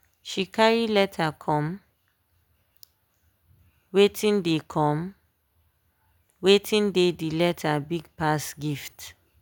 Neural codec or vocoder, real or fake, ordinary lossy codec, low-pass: none; real; none; none